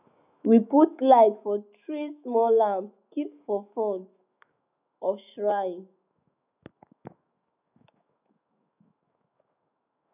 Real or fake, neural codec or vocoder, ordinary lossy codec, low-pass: fake; autoencoder, 48 kHz, 128 numbers a frame, DAC-VAE, trained on Japanese speech; none; 3.6 kHz